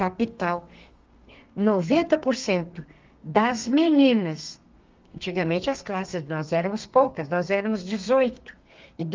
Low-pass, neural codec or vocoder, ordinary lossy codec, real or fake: 7.2 kHz; codec, 32 kHz, 1.9 kbps, SNAC; Opus, 32 kbps; fake